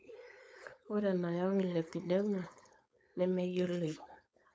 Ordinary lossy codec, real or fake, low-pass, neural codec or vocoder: none; fake; none; codec, 16 kHz, 4.8 kbps, FACodec